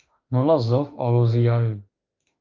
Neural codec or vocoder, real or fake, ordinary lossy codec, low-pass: autoencoder, 48 kHz, 32 numbers a frame, DAC-VAE, trained on Japanese speech; fake; Opus, 32 kbps; 7.2 kHz